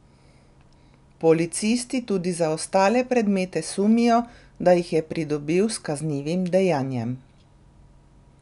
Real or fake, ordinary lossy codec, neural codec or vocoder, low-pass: real; none; none; 10.8 kHz